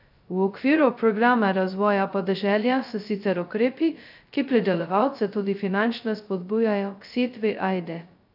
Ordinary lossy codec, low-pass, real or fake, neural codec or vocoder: none; 5.4 kHz; fake; codec, 16 kHz, 0.2 kbps, FocalCodec